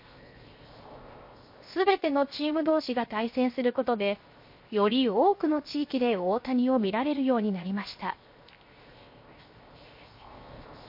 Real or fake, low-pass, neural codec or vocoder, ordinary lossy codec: fake; 5.4 kHz; codec, 16 kHz, 0.7 kbps, FocalCodec; MP3, 32 kbps